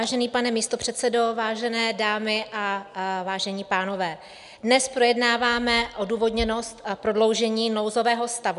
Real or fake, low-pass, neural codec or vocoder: real; 10.8 kHz; none